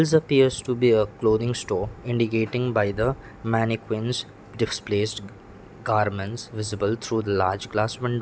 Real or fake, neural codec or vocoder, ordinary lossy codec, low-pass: real; none; none; none